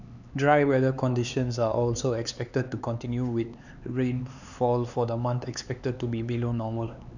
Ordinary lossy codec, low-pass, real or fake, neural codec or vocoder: none; 7.2 kHz; fake; codec, 16 kHz, 4 kbps, X-Codec, HuBERT features, trained on LibriSpeech